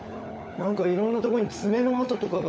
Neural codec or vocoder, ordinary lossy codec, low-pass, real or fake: codec, 16 kHz, 16 kbps, FunCodec, trained on LibriTTS, 50 frames a second; none; none; fake